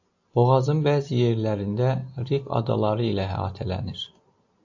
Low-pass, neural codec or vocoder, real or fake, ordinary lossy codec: 7.2 kHz; none; real; AAC, 48 kbps